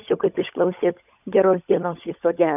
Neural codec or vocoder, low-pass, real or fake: codec, 16 kHz, 16 kbps, FunCodec, trained on LibriTTS, 50 frames a second; 3.6 kHz; fake